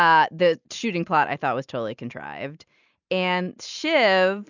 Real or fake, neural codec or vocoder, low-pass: real; none; 7.2 kHz